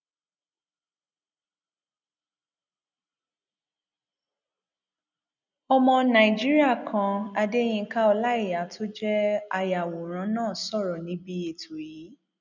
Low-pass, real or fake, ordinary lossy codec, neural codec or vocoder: 7.2 kHz; real; none; none